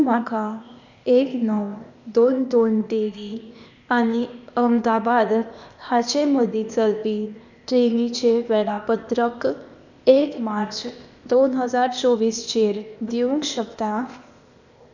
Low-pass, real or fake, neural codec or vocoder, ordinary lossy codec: 7.2 kHz; fake; codec, 16 kHz, 0.8 kbps, ZipCodec; none